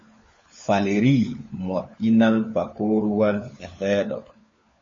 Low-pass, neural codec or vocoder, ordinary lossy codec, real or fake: 7.2 kHz; codec, 16 kHz, 4 kbps, FunCodec, trained on LibriTTS, 50 frames a second; MP3, 32 kbps; fake